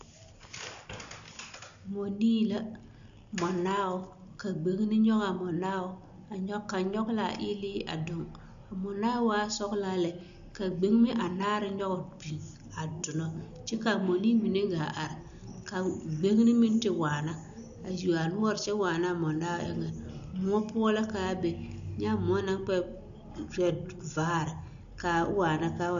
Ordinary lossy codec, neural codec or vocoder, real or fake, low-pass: AAC, 96 kbps; none; real; 7.2 kHz